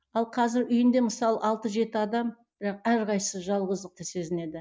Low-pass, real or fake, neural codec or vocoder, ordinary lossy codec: none; real; none; none